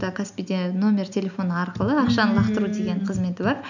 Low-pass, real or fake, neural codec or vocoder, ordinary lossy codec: 7.2 kHz; real; none; none